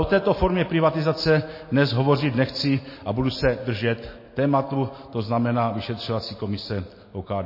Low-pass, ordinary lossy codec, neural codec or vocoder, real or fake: 5.4 kHz; MP3, 24 kbps; none; real